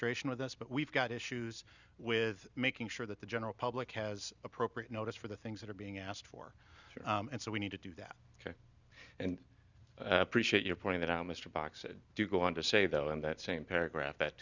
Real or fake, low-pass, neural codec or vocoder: real; 7.2 kHz; none